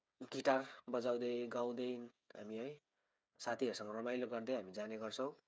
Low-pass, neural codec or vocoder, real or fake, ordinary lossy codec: none; codec, 16 kHz, 8 kbps, FreqCodec, smaller model; fake; none